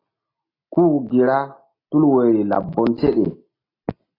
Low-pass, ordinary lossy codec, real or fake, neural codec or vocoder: 5.4 kHz; AAC, 24 kbps; real; none